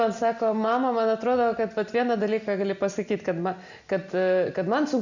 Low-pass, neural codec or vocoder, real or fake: 7.2 kHz; none; real